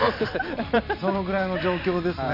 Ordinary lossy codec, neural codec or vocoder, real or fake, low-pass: none; vocoder, 44.1 kHz, 128 mel bands every 256 samples, BigVGAN v2; fake; 5.4 kHz